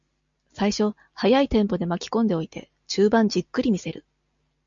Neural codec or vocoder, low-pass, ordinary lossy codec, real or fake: none; 7.2 kHz; MP3, 64 kbps; real